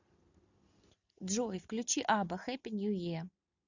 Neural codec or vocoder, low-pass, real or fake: none; 7.2 kHz; real